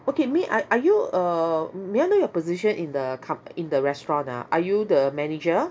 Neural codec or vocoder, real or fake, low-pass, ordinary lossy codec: none; real; none; none